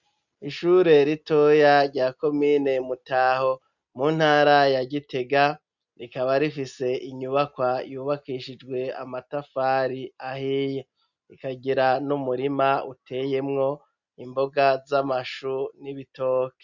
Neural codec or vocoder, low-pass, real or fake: none; 7.2 kHz; real